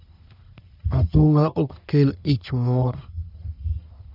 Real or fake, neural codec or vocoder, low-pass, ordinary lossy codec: fake; codec, 44.1 kHz, 1.7 kbps, Pupu-Codec; 5.4 kHz; none